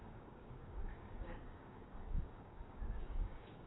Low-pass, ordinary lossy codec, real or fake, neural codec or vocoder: 7.2 kHz; AAC, 16 kbps; fake; codec, 16 kHz, 6 kbps, DAC